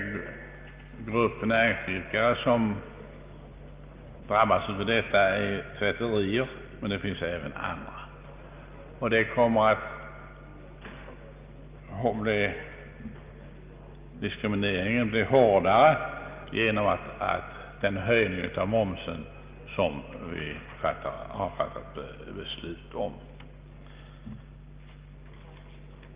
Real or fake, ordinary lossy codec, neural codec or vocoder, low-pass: real; Opus, 32 kbps; none; 3.6 kHz